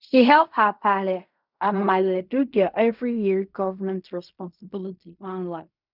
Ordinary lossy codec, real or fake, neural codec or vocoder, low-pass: none; fake; codec, 16 kHz in and 24 kHz out, 0.4 kbps, LongCat-Audio-Codec, fine tuned four codebook decoder; 5.4 kHz